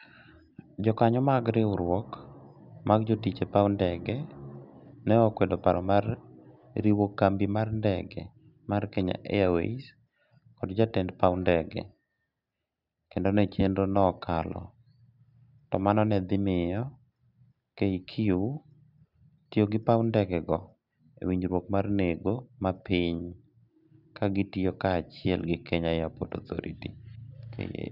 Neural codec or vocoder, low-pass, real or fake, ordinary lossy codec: none; 5.4 kHz; real; none